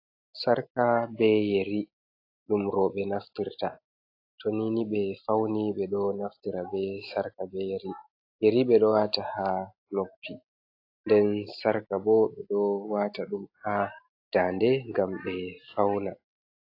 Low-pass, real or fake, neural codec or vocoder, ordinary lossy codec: 5.4 kHz; real; none; AAC, 32 kbps